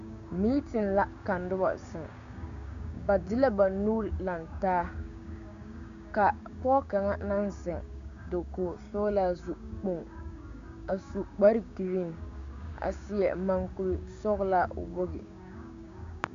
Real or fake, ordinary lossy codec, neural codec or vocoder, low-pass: fake; MP3, 64 kbps; codec, 16 kHz, 6 kbps, DAC; 7.2 kHz